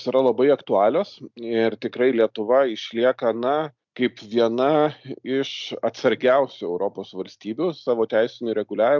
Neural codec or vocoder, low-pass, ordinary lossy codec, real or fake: none; 7.2 kHz; MP3, 64 kbps; real